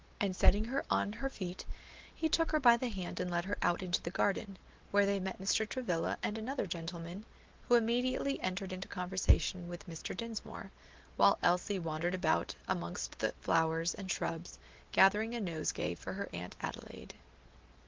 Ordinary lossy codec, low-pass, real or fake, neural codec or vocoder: Opus, 16 kbps; 7.2 kHz; real; none